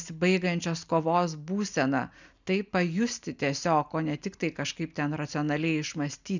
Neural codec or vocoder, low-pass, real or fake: none; 7.2 kHz; real